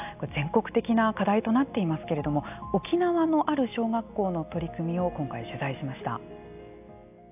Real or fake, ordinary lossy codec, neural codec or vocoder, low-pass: real; AAC, 32 kbps; none; 3.6 kHz